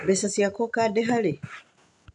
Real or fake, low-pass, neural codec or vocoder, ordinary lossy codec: real; 10.8 kHz; none; none